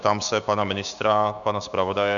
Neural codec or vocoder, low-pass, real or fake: codec, 16 kHz, 6 kbps, DAC; 7.2 kHz; fake